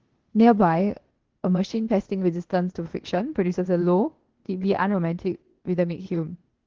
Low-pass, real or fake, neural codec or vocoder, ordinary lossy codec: 7.2 kHz; fake; codec, 16 kHz, 0.8 kbps, ZipCodec; Opus, 16 kbps